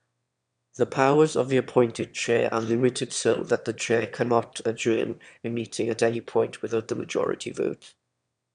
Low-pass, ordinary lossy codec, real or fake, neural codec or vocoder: 9.9 kHz; none; fake; autoencoder, 22.05 kHz, a latent of 192 numbers a frame, VITS, trained on one speaker